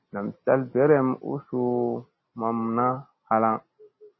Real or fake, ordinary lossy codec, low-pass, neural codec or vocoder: real; MP3, 24 kbps; 7.2 kHz; none